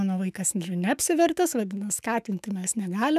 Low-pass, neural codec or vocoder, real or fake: 14.4 kHz; autoencoder, 48 kHz, 128 numbers a frame, DAC-VAE, trained on Japanese speech; fake